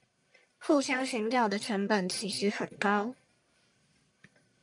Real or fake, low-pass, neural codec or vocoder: fake; 10.8 kHz; codec, 44.1 kHz, 1.7 kbps, Pupu-Codec